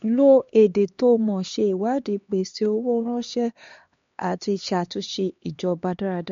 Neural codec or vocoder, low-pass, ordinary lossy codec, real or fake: codec, 16 kHz, 2 kbps, X-Codec, HuBERT features, trained on LibriSpeech; 7.2 kHz; MP3, 48 kbps; fake